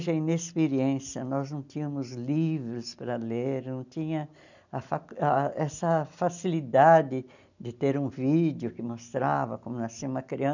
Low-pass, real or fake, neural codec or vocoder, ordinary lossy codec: 7.2 kHz; real; none; none